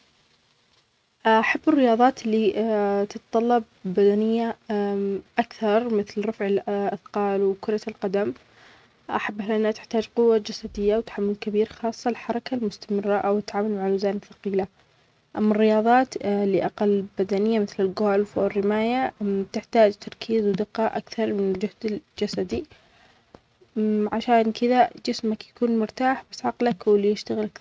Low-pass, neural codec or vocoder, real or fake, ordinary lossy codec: none; none; real; none